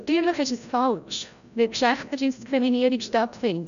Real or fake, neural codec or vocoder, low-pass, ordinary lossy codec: fake; codec, 16 kHz, 0.5 kbps, FreqCodec, larger model; 7.2 kHz; none